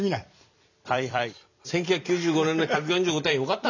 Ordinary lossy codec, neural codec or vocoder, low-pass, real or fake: none; vocoder, 44.1 kHz, 128 mel bands every 256 samples, BigVGAN v2; 7.2 kHz; fake